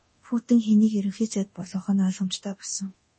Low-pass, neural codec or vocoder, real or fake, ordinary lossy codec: 10.8 kHz; codec, 24 kHz, 0.9 kbps, DualCodec; fake; MP3, 32 kbps